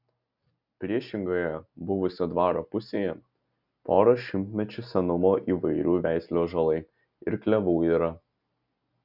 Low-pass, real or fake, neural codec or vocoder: 5.4 kHz; real; none